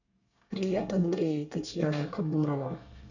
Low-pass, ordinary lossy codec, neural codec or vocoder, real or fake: 7.2 kHz; none; codec, 24 kHz, 1 kbps, SNAC; fake